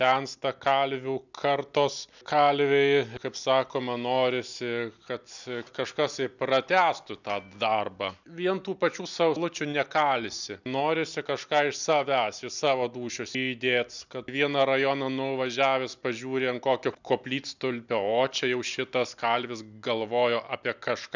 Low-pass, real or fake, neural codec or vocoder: 7.2 kHz; real; none